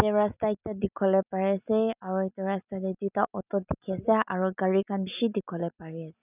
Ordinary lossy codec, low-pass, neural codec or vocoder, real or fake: none; 3.6 kHz; none; real